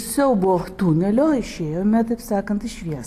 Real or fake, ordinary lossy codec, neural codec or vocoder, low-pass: real; AAC, 64 kbps; none; 14.4 kHz